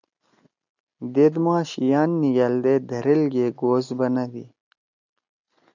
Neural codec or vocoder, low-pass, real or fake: none; 7.2 kHz; real